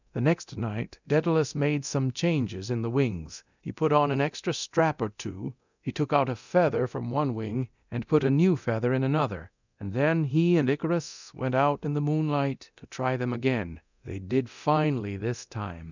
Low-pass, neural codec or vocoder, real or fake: 7.2 kHz; codec, 24 kHz, 0.9 kbps, DualCodec; fake